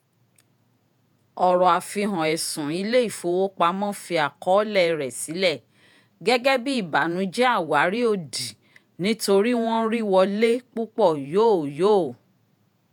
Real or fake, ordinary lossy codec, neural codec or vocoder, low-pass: fake; none; vocoder, 48 kHz, 128 mel bands, Vocos; none